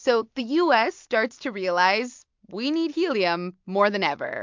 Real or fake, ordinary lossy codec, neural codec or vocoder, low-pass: real; MP3, 64 kbps; none; 7.2 kHz